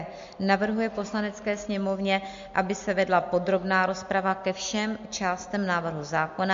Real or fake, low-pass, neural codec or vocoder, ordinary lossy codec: real; 7.2 kHz; none; MP3, 48 kbps